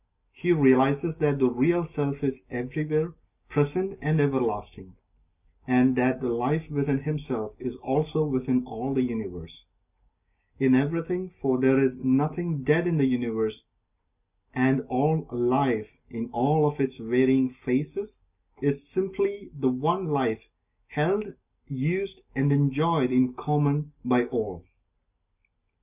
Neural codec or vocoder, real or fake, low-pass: none; real; 3.6 kHz